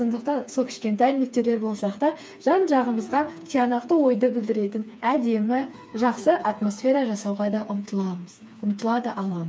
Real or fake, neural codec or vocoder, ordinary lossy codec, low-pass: fake; codec, 16 kHz, 4 kbps, FreqCodec, smaller model; none; none